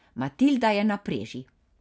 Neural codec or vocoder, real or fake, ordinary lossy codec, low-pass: none; real; none; none